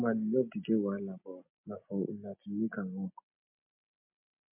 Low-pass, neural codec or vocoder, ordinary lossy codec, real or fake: 3.6 kHz; none; MP3, 32 kbps; real